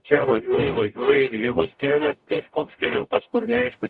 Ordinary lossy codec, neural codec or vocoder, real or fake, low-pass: Opus, 64 kbps; codec, 44.1 kHz, 0.9 kbps, DAC; fake; 10.8 kHz